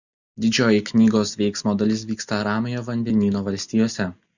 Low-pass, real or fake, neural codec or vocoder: 7.2 kHz; real; none